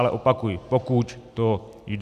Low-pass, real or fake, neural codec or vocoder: 14.4 kHz; real; none